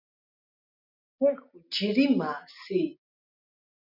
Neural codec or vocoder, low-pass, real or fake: none; 5.4 kHz; real